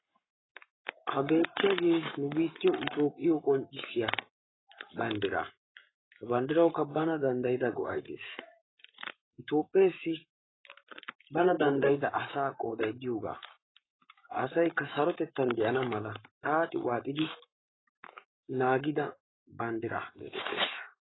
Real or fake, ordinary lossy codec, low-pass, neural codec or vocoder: fake; AAC, 16 kbps; 7.2 kHz; codec, 44.1 kHz, 7.8 kbps, Pupu-Codec